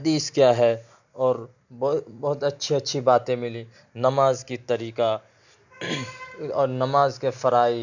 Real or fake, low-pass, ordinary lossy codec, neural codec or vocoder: real; 7.2 kHz; none; none